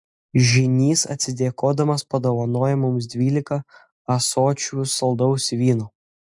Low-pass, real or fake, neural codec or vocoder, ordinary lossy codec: 10.8 kHz; real; none; MP3, 64 kbps